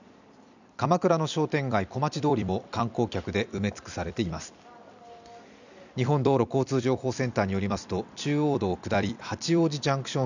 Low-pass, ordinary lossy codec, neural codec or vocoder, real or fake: 7.2 kHz; none; vocoder, 44.1 kHz, 128 mel bands every 256 samples, BigVGAN v2; fake